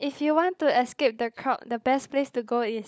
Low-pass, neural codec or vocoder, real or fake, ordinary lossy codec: none; codec, 16 kHz, 16 kbps, FunCodec, trained on LibriTTS, 50 frames a second; fake; none